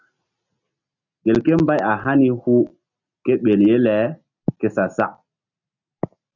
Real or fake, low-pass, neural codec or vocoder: real; 7.2 kHz; none